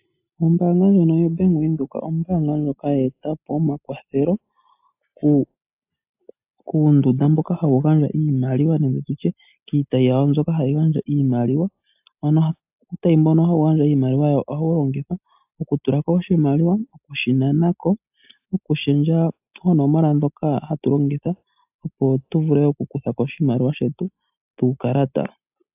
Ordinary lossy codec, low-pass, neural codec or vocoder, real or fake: AAC, 32 kbps; 3.6 kHz; none; real